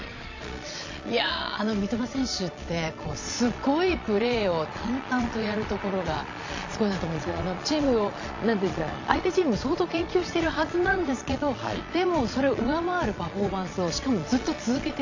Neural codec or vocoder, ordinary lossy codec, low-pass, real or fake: vocoder, 22.05 kHz, 80 mel bands, Vocos; AAC, 48 kbps; 7.2 kHz; fake